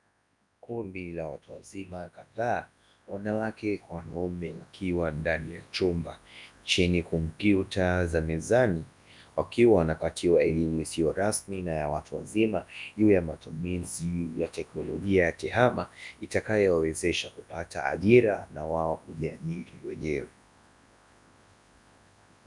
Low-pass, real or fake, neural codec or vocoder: 10.8 kHz; fake; codec, 24 kHz, 0.9 kbps, WavTokenizer, large speech release